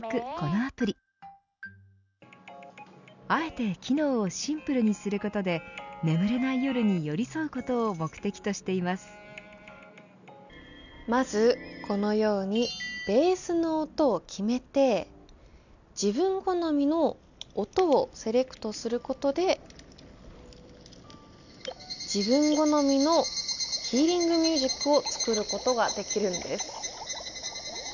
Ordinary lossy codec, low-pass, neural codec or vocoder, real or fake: none; 7.2 kHz; none; real